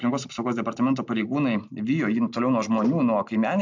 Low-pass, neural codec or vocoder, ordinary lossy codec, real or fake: 7.2 kHz; none; MP3, 64 kbps; real